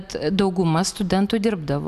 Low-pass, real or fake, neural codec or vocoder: 14.4 kHz; real; none